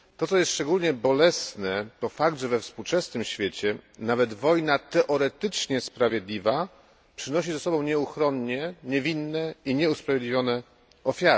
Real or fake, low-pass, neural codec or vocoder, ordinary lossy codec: real; none; none; none